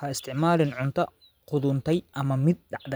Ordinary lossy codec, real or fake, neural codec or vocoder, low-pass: none; fake; vocoder, 44.1 kHz, 128 mel bands every 512 samples, BigVGAN v2; none